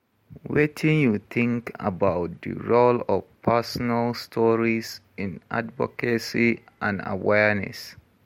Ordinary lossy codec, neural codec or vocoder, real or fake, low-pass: MP3, 64 kbps; none; real; 19.8 kHz